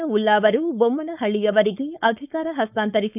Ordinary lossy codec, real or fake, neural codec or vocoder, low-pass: none; fake; codec, 16 kHz, 4.8 kbps, FACodec; 3.6 kHz